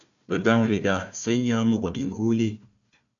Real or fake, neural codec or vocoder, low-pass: fake; codec, 16 kHz, 1 kbps, FunCodec, trained on Chinese and English, 50 frames a second; 7.2 kHz